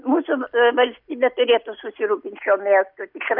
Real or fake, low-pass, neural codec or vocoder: real; 5.4 kHz; none